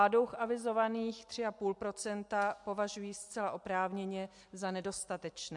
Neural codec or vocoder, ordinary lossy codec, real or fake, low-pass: none; MP3, 64 kbps; real; 10.8 kHz